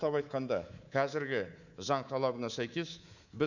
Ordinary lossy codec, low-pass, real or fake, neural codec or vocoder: none; 7.2 kHz; fake; codec, 24 kHz, 3.1 kbps, DualCodec